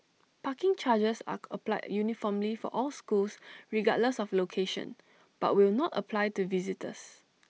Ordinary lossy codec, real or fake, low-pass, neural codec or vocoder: none; real; none; none